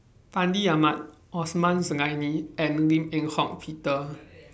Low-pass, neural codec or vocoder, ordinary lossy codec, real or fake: none; none; none; real